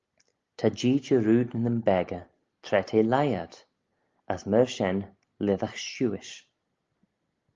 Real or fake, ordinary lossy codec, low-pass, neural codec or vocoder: real; Opus, 32 kbps; 7.2 kHz; none